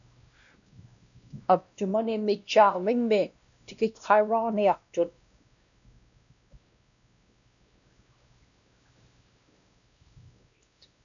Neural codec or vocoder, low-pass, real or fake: codec, 16 kHz, 1 kbps, X-Codec, WavLM features, trained on Multilingual LibriSpeech; 7.2 kHz; fake